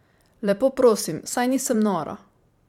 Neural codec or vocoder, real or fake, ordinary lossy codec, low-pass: vocoder, 44.1 kHz, 128 mel bands every 512 samples, BigVGAN v2; fake; MP3, 96 kbps; 19.8 kHz